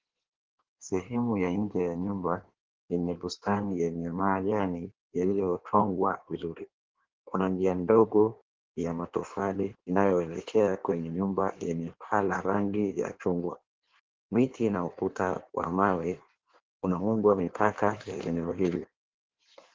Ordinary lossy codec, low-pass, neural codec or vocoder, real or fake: Opus, 16 kbps; 7.2 kHz; codec, 16 kHz in and 24 kHz out, 1.1 kbps, FireRedTTS-2 codec; fake